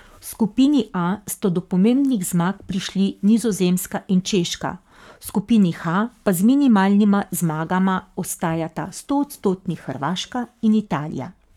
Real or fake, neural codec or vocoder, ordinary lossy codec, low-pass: fake; codec, 44.1 kHz, 7.8 kbps, Pupu-Codec; none; 19.8 kHz